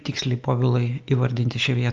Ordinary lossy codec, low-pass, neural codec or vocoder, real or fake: Opus, 24 kbps; 7.2 kHz; none; real